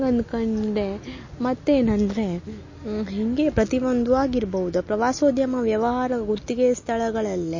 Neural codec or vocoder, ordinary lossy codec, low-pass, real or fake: none; MP3, 32 kbps; 7.2 kHz; real